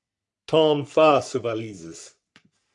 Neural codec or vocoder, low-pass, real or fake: codec, 44.1 kHz, 3.4 kbps, Pupu-Codec; 10.8 kHz; fake